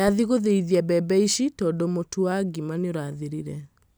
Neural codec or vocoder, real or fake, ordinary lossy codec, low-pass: none; real; none; none